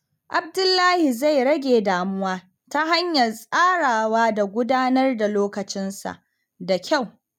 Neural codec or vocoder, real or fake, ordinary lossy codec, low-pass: none; real; none; none